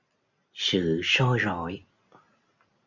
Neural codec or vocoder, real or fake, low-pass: none; real; 7.2 kHz